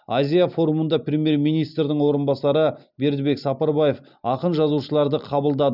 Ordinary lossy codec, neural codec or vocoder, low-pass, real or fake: none; none; 5.4 kHz; real